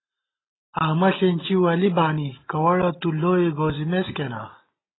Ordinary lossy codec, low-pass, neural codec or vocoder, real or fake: AAC, 16 kbps; 7.2 kHz; none; real